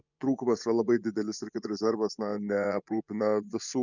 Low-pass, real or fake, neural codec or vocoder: 7.2 kHz; fake; vocoder, 24 kHz, 100 mel bands, Vocos